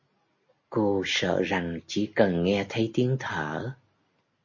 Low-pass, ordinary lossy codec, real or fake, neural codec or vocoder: 7.2 kHz; MP3, 32 kbps; real; none